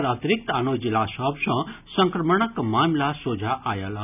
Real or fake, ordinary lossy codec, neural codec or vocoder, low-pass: real; none; none; 3.6 kHz